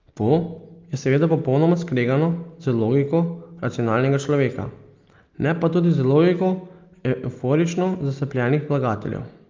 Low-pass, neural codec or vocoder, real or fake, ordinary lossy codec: 7.2 kHz; none; real; Opus, 24 kbps